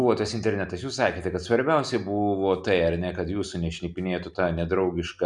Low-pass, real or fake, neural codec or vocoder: 10.8 kHz; real; none